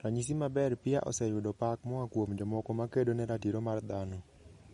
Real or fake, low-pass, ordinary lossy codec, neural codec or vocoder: real; 19.8 kHz; MP3, 48 kbps; none